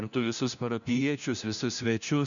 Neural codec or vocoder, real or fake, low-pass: codec, 16 kHz, 1 kbps, FunCodec, trained on LibriTTS, 50 frames a second; fake; 7.2 kHz